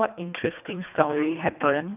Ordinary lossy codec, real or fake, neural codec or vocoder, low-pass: none; fake; codec, 24 kHz, 1.5 kbps, HILCodec; 3.6 kHz